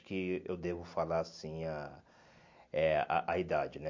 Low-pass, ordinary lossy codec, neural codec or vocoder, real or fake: 7.2 kHz; MP3, 48 kbps; none; real